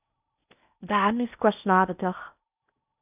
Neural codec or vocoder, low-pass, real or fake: codec, 16 kHz in and 24 kHz out, 0.6 kbps, FocalCodec, streaming, 4096 codes; 3.6 kHz; fake